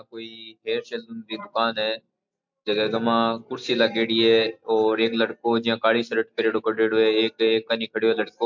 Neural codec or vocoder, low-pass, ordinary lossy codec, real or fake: none; 7.2 kHz; MP3, 64 kbps; real